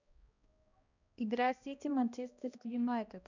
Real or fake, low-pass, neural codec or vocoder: fake; 7.2 kHz; codec, 16 kHz, 1 kbps, X-Codec, HuBERT features, trained on balanced general audio